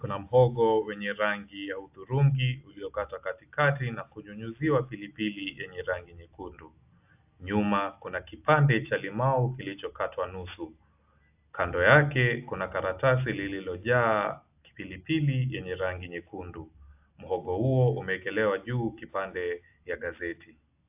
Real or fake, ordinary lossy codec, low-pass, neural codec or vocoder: real; AAC, 32 kbps; 3.6 kHz; none